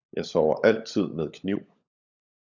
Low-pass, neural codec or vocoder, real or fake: 7.2 kHz; codec, 16 kHz, 16 kbps, FunCodec, trained on LibriTTS, 50 frames a second; fake